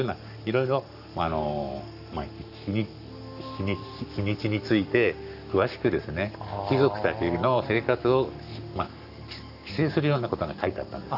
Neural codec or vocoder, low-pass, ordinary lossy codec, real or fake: codec, 44.1 kHz, 7.8 kbps, Pupu-Codec; 5.4 kHz; none; fake